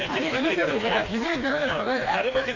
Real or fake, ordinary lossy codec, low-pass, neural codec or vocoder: fake; AAC, 32 kbps; 7.2 kHz; codec, 16 kHz, 2 kbps, FreqCodec, smaller model